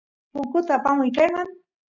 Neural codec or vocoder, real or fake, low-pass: none; real; 7.2 kHz